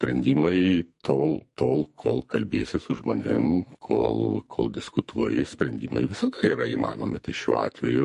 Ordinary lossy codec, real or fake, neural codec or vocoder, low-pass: MP3, 48 kbps; fake; codec, 44.1 kHz, 2.6 kbps, SNAC; 14.4 kHz